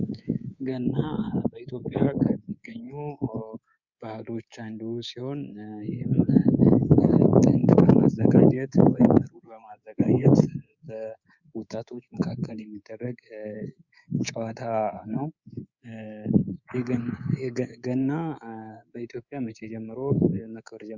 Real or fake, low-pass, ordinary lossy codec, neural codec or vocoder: fake; 7.2 kHz; Opus, 64 kbps; codec, 24 kHz, 3.1 kbps, DualCodec